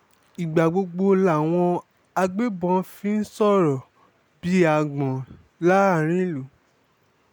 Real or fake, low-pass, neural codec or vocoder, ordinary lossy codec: real; none; none; none